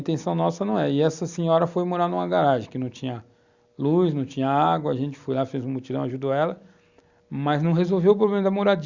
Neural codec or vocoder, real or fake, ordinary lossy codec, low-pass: none; real; Opus, 64 kbps; 7.2 kHz